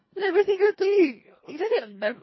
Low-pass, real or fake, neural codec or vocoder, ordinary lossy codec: 7.2 kHz; fake; codec, 24 kHz, 1.5 kbps, HILCodec; MP3, 24 kbps